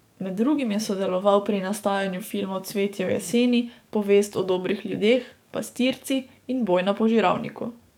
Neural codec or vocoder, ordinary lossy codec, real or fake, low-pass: codec, 44.1 kHz, 7.8 kbps, DAC; none; fake; 19.8 kHz